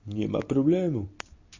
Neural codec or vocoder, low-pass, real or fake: none; 7.2 kHz; real